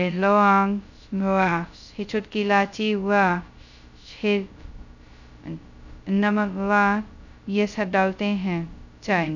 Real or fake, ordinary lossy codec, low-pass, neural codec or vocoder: fake; none; 7.2 kHz; codec, 16 kHz, 0.2 kbps, FocalCodec